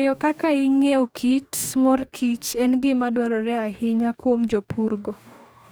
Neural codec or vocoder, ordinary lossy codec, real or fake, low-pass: codec, 44.1 kHz, 2.6 kbps, DAC; none; fake; none